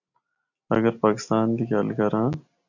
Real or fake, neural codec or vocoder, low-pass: real; none; 7.2 kHz